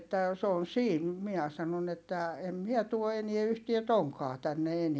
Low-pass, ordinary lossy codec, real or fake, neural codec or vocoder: none; none; real; none